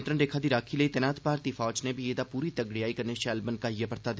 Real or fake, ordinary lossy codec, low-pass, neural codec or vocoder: real; none; none; none